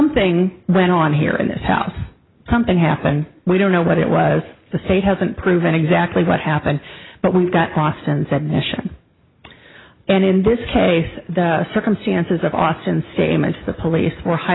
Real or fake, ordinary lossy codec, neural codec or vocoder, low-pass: real; AAC, 16 kbps; none; 7.2 kHz